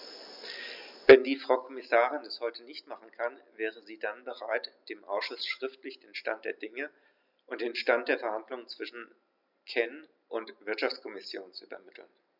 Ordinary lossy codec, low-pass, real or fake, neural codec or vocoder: none; 5.4 kHz; real; none